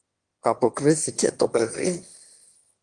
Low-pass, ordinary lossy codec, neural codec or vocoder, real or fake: 9.9 kHz; Opus, 24 kbps; autoencoder, 22.05 kHz, a latent of 192 numbers a frame, VITS, trained on one speaker; fake